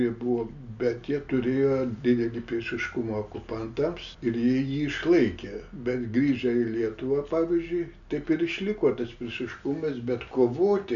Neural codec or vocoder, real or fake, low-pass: none; real; 7.2 kHz